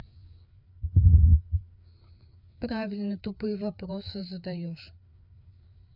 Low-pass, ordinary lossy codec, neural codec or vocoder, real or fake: 5.4 kHz; none; codec, 16 kHz, 4 kbps, FreqCodec, larger model; fake